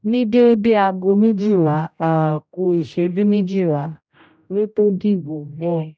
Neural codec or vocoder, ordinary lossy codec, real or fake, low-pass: codec, 16 kHz, 0.5 kbps, X-Codec, HuBERT features, trained on general audio; none; fake; none